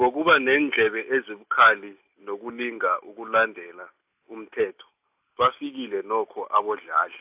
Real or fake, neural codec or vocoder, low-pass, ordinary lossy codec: real; none; 3.6 kHz; AAC, 32 kbps